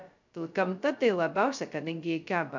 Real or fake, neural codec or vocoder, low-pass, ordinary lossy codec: fake; codec, 16 kHz, 0.2 kbps, FocalCodec; 7.2 kHz; MP3, 64 kbps